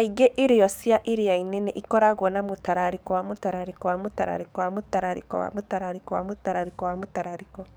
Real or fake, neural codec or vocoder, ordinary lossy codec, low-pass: fake; codec, 44.1 kHz, 7.8 kbps, DAC; none; none